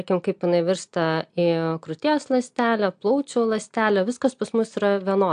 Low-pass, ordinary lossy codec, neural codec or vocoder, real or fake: 9.9 kHz; AAC, 64 kbps; none; real